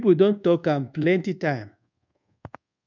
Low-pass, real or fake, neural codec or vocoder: 7.2 kHz; fake; codec, 24 kHz, 1.2 kbps, DualCodec